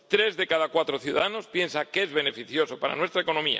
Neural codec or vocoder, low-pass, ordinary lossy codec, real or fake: none; none; none; real